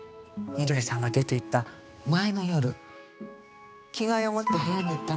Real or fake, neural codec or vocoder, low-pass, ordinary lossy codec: fake; codec, 16 kHz, 2 kbps, X-Codec, HuBERT features, trained on balanced general audio; none; none